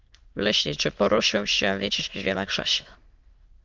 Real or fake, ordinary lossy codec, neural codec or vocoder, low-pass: fake; Opus, 32 kbps; autoencoder, 22.05 kHz, a latent of 192 numbers a frame, VITS, trained on many speakers; 7.2 kHz